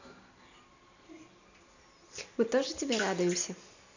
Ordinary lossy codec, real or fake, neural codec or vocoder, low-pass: none; real; none; 7.2 kHz